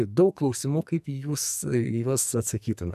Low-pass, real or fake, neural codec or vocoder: 14.4 kHz; fake; codec, 44.1 kHz, 2.6 kbps, SNAC